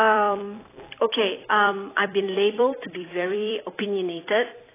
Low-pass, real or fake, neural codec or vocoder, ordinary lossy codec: 3.6 kHz; fake; vocoder, 44.1 kHz, 128 mel bands every 256 samples, BigVGAN v2; AAC, 16 kbps